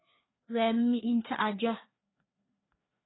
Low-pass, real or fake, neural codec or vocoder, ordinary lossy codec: 7.2 kHz; fake; codec, 16 kHz, 2 kbps, FreqCodec, larger model; AAC, 16 kbps